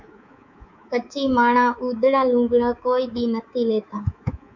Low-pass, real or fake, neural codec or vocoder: 7.2 kHz; fake; codec, 24 kHz, 3.1 kbps, DualCodec